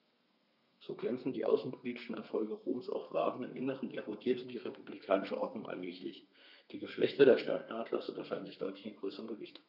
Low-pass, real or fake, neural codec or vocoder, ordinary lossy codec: 5.4 kHz; fake; codec, 32 kHz, 1.9 kbps, SNAC; none